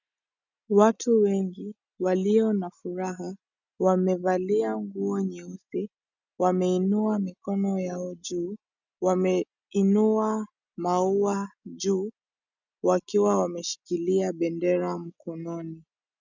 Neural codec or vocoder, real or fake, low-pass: none; real; 7.2 kHz